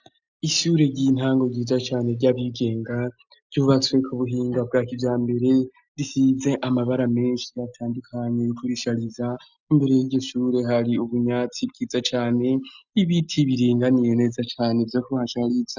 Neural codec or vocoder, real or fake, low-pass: none; real; 7.2 kHz